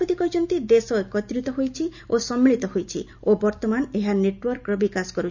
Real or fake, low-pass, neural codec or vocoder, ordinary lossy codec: real; 7.2 kHz; none; none